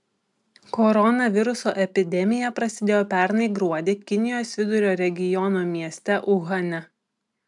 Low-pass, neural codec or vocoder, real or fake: 10.8 kHz; none; real